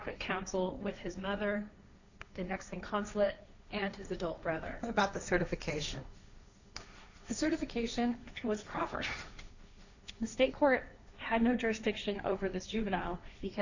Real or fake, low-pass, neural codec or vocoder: fake; 7.2 kHz; codec, 16 kHz, 1.1 kbps, Voila-Tokenizer